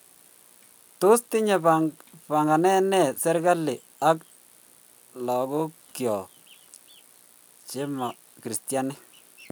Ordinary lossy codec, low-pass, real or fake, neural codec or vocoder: none; none; real; none